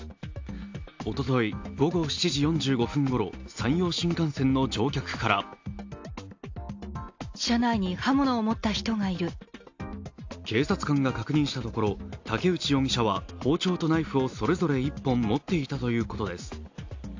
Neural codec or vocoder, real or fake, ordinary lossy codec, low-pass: none; real; AAC, 48 kbps; 7.2 kHz